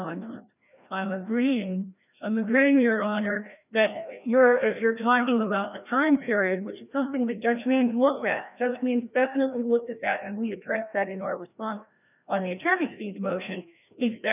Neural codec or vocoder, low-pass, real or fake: codec, 16 kHz, 1 kbps, FreqCodec, larger model; 3.6 kHz; fake